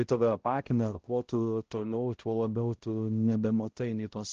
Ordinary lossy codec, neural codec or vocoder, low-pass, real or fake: Opus, 16 kbps; codec, 16 kHz, 0.5 kbps, X-Codec, HuBERT features, trained on balanced general audio; 7.2 kHz; fake